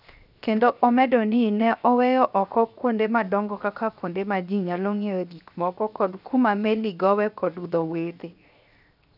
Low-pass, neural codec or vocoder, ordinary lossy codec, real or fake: 5.4 kHz; codec, 16 kHz, 0.7 kbps, FocalCodec; none; fake